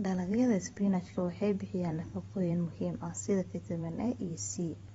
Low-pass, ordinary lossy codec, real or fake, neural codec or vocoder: 19.8 kHz; AAC, 24 kbps; fake; vocoder, 44.1 kHz, 128 mel bands every 512 samples, BigVGAN v2